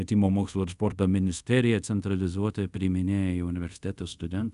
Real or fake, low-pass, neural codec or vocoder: fake; 10.8 kHz; codec, 24 kHz, 0.5 kbps, DualCodec